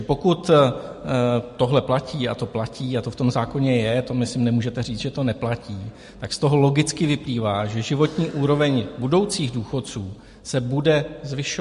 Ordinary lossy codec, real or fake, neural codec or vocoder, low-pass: MP3, 48 kbps; real; none; 14.4 kHz